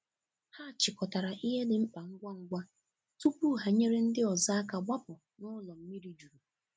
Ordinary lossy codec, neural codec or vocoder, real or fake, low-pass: none; none; real; none